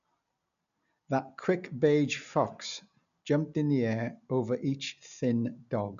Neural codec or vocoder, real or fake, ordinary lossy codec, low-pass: none; real; none; 7.2 kHz